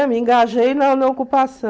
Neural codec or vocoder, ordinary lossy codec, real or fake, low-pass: none; none; real; none